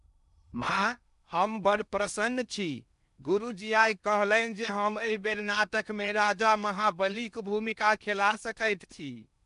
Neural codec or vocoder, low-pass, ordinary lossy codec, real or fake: codec, 16 kHz in and 24 kHz out, 0.8 kbps, FocalCodec, streaming, 65536 codes; 10.8 kHz; none; fake